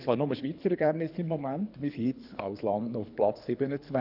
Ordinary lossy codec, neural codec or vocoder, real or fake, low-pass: none; codec, 24 kHz, 3 kbps, HILCodec; fake; 5.4 kHz